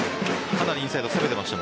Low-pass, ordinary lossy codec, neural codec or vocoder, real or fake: none; none; none; real